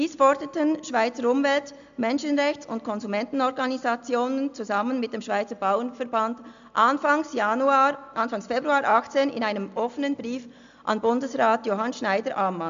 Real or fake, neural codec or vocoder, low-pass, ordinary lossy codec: real; none; 7.2 kHz; MP3, 64 kbps